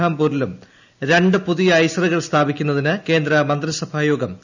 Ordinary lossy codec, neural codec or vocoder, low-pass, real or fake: none; none; 7.2 kHz; real